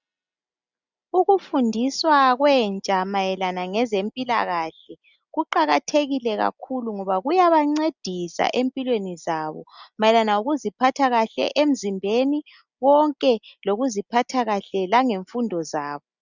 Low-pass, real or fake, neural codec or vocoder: 7.2 kHz; real; none